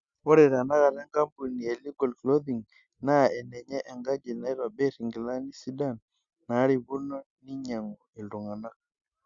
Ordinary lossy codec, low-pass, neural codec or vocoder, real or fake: Opus, 64 kbps; 7.2 kHz; none; real